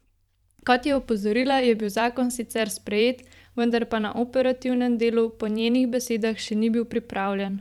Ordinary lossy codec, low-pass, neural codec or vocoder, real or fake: none; 19.8 kHz; vocoder, 44.1 kHz, 128 mel bands every 512 samples, BigVGAN v2; fake